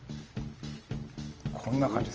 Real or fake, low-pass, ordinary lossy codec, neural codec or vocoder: real; 7.2 kHz; Opus, 24 kbps; none